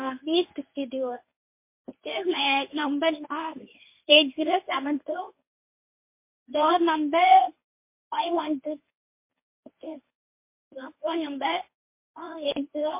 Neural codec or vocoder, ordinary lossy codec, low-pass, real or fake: codec, 24 kHz, 0.9 kbps, WavTokenizer, medium speech release version 1; MP3, 24 kbps; 3.6 kHz; fake